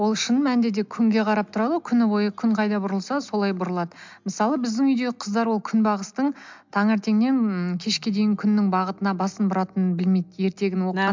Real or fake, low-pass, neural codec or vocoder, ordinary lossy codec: real; 7.2 kHz; none; MP3, 64 kbps